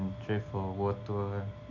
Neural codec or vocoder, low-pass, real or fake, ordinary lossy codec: none; 7.2 kHz; real; AAC, 48 kbps